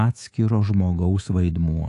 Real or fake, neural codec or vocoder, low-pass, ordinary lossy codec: fake; codec, 24 kHz, 3.1 kbps, DualCodec; 10.8 kHz; AAC, 48 kbps